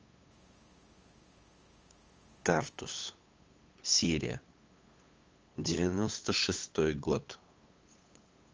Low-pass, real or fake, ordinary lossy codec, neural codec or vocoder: 7.2 kHz; fake; Opus, 24 kbps; codec, 16 kHz, 8 kbps, FunCodec, trained on LibriTTS, 25 frames a second